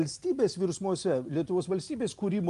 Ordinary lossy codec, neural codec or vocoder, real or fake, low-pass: Opus, 32 kbps; none; real; 10.8 kHz